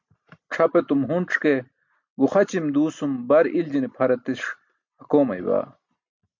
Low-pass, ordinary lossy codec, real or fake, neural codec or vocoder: 7.2 kHz; MP3, 64 kbps; real; none